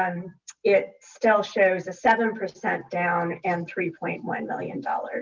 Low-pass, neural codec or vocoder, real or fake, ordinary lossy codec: 7.2 kHz; none; real; Opus, 32 kbps